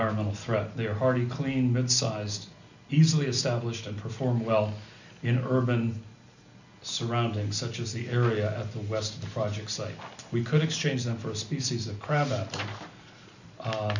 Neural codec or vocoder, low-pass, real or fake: none; 7.2 kHz; real